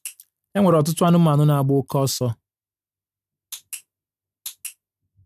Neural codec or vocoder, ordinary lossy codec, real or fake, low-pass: none; none; real; 14.4 kHz